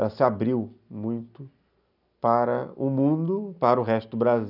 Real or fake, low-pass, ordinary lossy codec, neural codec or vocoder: real; 5.4 kHz; none; none